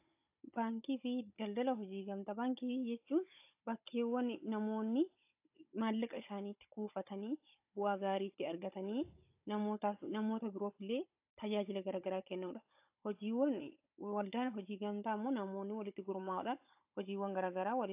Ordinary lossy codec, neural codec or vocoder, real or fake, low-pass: AAC, 32 kbps; codec, 16 kHz, 16 kbps, FunCodec, trained on Chinese and English, 50 frames a second; fake; 3.6 kHz